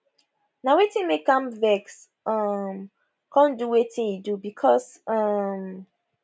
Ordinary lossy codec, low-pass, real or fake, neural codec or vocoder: none; none; real; none